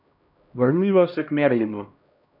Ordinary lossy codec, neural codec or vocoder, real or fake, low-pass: none; codec, 16 kHz, 1 kbps, X-Codec, HuBERT features, trained on LibriSpeech; fake; 5.4 kHz